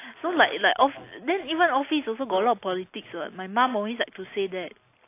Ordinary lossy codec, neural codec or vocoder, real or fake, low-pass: AAC, 24 kbps; none; real; 3.6 kHz